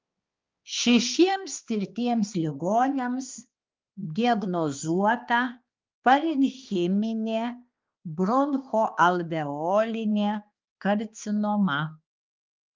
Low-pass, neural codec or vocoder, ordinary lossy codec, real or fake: 7.2 kHz; codec, 16 kHz, 2 kbps, X-Codec, HuBERT features, trained on balanced general audio; Opus, 24 kbps; fake